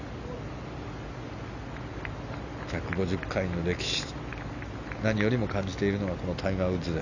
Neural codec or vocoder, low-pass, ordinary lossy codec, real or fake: none; 7.2 kHz; none; real